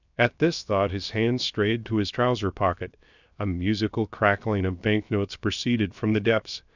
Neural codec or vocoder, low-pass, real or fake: codec, 16 kHz, 0.7 kbps, FocalCodec; 7.2 kHz; fake